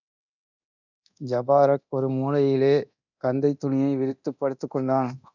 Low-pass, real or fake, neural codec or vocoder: 7.2 kHz; fake; codec, 24 kHz, 0.9 kbps, DualCodec